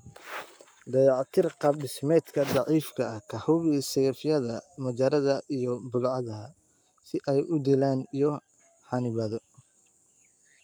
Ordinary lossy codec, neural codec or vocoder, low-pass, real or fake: none; codec, 44.1 kHz, 7.8 kbps, Pupu-Codec; none; fake